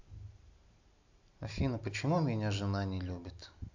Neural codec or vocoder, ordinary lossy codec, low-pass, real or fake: none; AAC, 48 kbps; 7.2 kHz; real